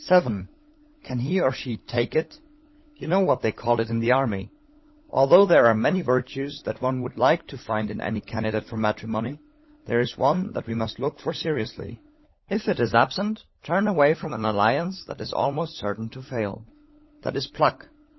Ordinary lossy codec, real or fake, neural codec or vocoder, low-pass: MP3, 24 kbps; fake; codec, 16 kHz, 16 kbps, FunCodec, trained on LibriTTS, 50 frames a second; 7.2 kHz